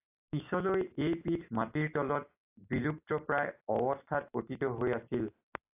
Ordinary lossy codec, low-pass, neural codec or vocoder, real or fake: AAC, 32 kbps; 3.6 kHz; none; real